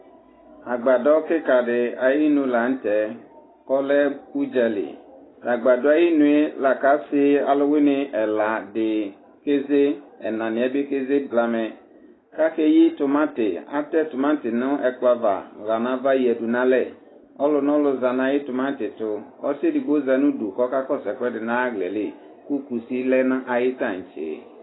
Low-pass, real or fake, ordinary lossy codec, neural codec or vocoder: 7.2 kHz; real; AAC, 16 kbps; none